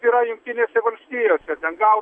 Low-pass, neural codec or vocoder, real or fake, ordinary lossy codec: 9.9 kHz; none; real; AAC, 64 kbps